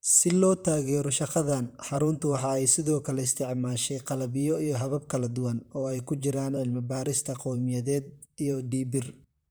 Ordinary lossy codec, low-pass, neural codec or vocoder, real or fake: none; none; vocoder, 44.1 kHz, 128 mel bands, Pupu-Vocoder; fake